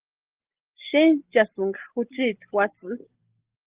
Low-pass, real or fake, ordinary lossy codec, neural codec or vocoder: 3.6 kHz; real; Opus, 16 kbps; none